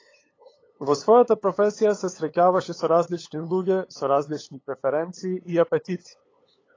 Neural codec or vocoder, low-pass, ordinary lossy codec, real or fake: codec, 16 kHz, 8 kbps, FunCodec, trained on LibriTTS, 25 frames a second; 7.2 kHz; AAC, 32 kbps; fake